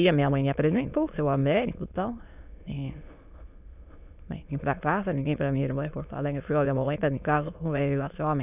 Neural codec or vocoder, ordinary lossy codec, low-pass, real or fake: autoencoder, 22.05 kHz, a latent of 192 numbers a frame, VITS, trained on many speakers; none; 3.6 kHz; fake